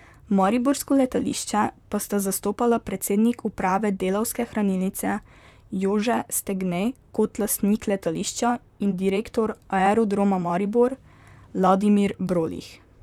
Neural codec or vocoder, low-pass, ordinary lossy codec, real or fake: vocoder, 44.1 kHz, 128 mel bands, Pupu-Vocoder; 19.8 kHz; none; fake